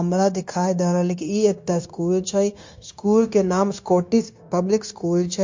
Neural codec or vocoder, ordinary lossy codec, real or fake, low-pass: codec, 16 kHz, 0.9 kbps, LongCat-Audio-Codec; MP3, 48 kbps; fake; 7.2 kHz